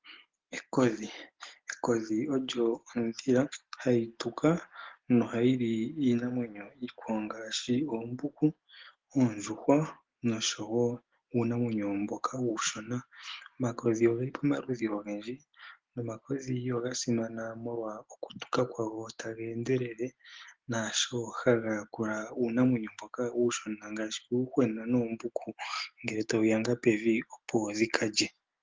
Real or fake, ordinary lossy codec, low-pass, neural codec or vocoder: real; Opus, 16 kbps; 7.2 kHz; none